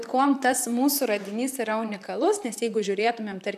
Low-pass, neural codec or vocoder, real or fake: 14.4 kHz; vocoder, 44.1 kHz, 128 mel bands, Pupu-Vocoder; fake